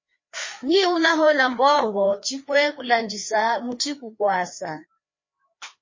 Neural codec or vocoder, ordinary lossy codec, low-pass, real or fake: codec, 16 kHz, 2 kbps, FreqCodec, larger model; MP3, 32 kbps; 7.2 kHz; fake